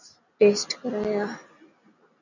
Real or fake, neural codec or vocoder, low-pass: real; none; 7.2 kHz